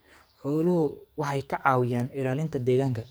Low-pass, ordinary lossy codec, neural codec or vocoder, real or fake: none; none; codec, 44.1 kHz, 2.6 kbps, SNAC; fake